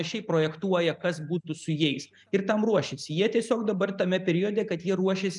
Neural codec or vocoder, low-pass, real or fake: none; 10.8 kHz; real